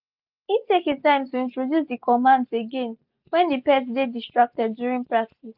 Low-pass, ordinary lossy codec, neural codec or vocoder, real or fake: 5.4 kHz; none; none; real